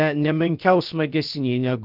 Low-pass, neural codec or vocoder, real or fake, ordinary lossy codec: 5.4 kHz; codec, 16 kHz, about 1 kbps, DyCAST, with the encoder's durations; fake; Opus, 24 kbps